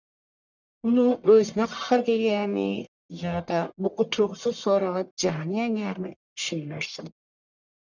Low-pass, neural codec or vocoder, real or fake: 7.2 kHz; codec, 44.1 kHz, 1.7 kbps, Pupu-Codec; fake